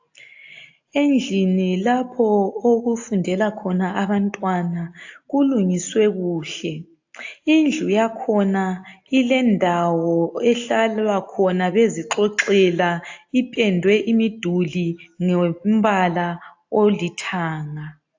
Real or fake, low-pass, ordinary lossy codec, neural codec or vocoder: real; 7.2 kHz; AAC, 48 kbps; none